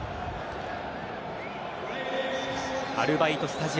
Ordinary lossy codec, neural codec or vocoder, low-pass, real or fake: none; none; none; real